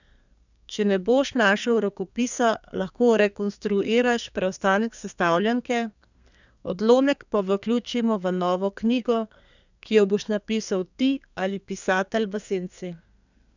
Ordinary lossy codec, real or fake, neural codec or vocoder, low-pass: none; fake; codec, 32 kHz, 1.9 kbps, SNAC; 7.2 kHz